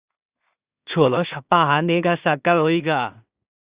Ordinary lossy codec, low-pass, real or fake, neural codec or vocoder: Opus, 64 kbps; 3.6 kHz; fake; codec, 16 kHz in and 24 kHz out, 0.4 kbps, LongCat-Audio-Codec, two codebook decoder